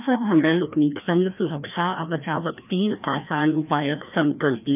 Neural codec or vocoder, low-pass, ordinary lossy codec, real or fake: codec, 16 kHz, 1 kbps, FreqCodec, larger model; 3.6 kHz; none; fake